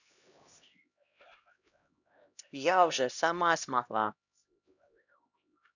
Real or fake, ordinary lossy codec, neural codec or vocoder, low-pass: fake; none; codec, 16 kHz, 1 kbps, X-Codec, HuBERT features, trained on LibriSpeech; 7.2 kHz